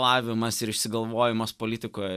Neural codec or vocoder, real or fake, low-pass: none; real; 14.4 kHz